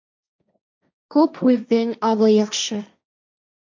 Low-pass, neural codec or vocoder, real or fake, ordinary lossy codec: 7.2 kHz; codec, 16 kHz, 1.1 kbps, Voila-Tokenizer; fake; MP3, 64 kbps